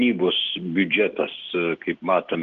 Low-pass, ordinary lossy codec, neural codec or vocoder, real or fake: 7.2 kHz; Opus, 16 kbps; none; real